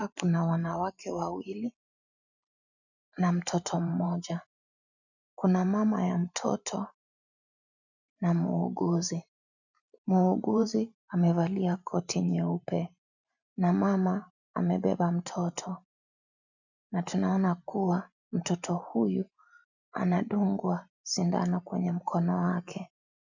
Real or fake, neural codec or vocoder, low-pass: fake; vocoder, 44.1 kHz, 128 mel bands every 512 samples, BigVGAN v2; 7.2 kHz